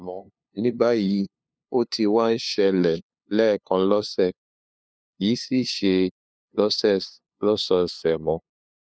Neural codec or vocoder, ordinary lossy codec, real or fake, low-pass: codec, 16 kHz, 2 kbps, FunCodec, trained on LibriTTS, 25 frames a second; none; fake; none